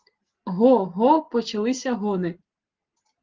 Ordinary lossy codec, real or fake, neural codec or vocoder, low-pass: Opus, 16 kbps; real; none; 7.2 kHz